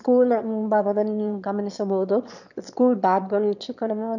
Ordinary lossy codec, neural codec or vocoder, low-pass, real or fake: none; autoencoder, 22.05 kHz, a latent of 192 numbers a frame, VITS, trained on one speaker; 7.2 kHz; fake